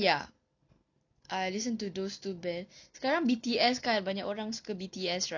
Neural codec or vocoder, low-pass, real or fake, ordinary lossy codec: none; 7.2 kHz; real; none